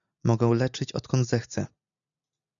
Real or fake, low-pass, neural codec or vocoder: real; 7.2 kHz; none